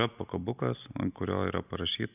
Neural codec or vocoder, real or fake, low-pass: none; real; 3.6 kHz